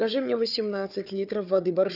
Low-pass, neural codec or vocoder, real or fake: 5.4 kHz; codec, 16 kHz, 4 kbps, FunCodec, trained on Chinese and English, 50 frames a second; fake